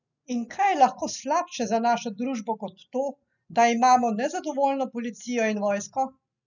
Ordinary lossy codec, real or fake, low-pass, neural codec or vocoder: none; real; 7.2 kHz; none